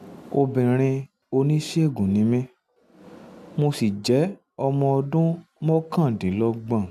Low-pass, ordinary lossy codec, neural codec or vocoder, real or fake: 14.4 kHz; none; none; real